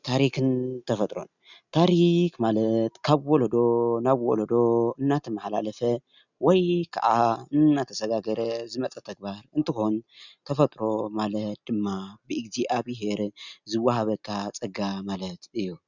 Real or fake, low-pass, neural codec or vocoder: real; 7.2 kHz; none